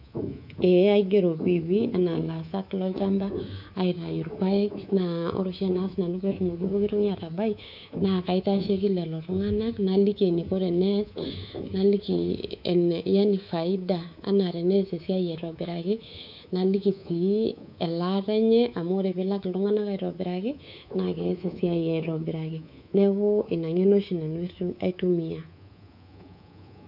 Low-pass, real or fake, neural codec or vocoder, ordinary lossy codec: 5.4 kHz; fake; codec, 24 kHz, 3.1 kbps, DualCodec; none